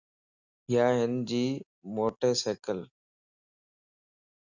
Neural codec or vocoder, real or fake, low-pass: none; real; 7.2 kHz